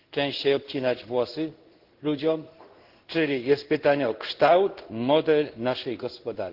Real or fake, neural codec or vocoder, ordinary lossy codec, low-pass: fake; codec, 16 kHz in and 24 kHz out, 1 kbps, XY-Tokenizer; Opus, 16 kbps; 5.4 kHz